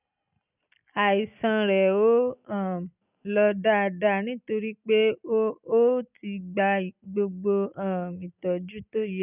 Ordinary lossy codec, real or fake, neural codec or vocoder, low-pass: none; real; none; 3.6 kHz